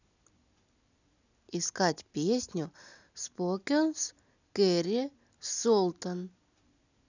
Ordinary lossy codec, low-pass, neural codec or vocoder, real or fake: none; 7.2 kHz; none; real